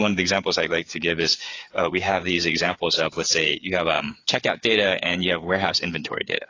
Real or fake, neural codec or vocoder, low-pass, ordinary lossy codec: fake; codec, 16 kHz, 8 kbps, FreqCodec, smaller model; 7.2 kHz; AAC, 32 kbps